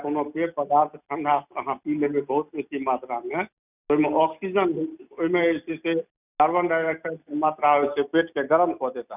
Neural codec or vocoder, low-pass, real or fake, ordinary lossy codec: none; 3.6 kHz; real; none